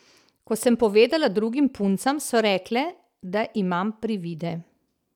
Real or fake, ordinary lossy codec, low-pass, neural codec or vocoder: real; none; 19.8 kHz; none